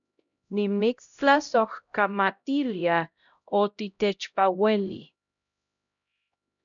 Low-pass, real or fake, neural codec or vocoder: 7.2 kHz; fake; codec, 16 kHz, 0.5 kbps, X-Codec, HuBERT features, trained on LibriSpeech